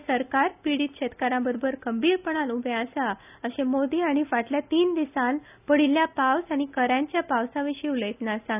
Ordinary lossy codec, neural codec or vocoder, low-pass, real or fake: none; none; 3.6 kHz; real